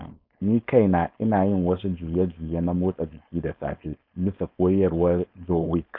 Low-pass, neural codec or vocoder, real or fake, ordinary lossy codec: 5.4 kHz; codec, 16 kHz, 4.8 kbps, FACodec; fake; none